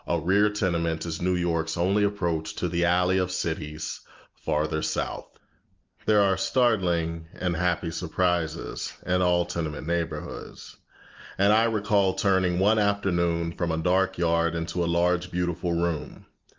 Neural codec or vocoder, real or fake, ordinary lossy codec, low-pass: none; real; Opus, 32 kbps; 7.2 kHz